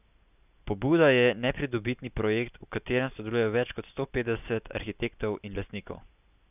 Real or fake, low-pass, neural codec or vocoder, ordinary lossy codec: real; 3.6 kHz; none; none